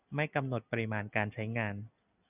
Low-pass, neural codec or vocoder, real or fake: 3.6 kHz; none; real